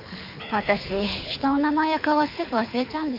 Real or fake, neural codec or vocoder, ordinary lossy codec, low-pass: fake; codec, 24 kHz, 6 kbps, HILCodec; MP3, 48 kbps; 5.4 kHz